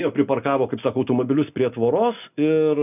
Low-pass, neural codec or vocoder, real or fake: 3.6 kHz; none; real